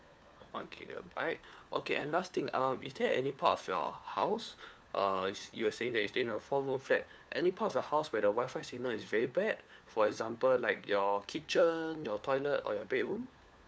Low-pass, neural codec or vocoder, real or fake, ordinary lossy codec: none; codec, 16 kHz, 4 kbps, FunCodec, trained on LibriTTS, 50 frames a second; fake; none